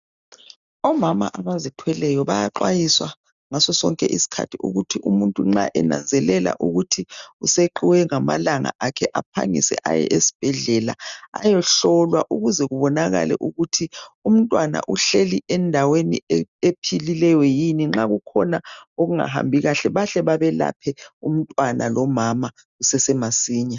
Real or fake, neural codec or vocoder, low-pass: real; none; 7.2 kHz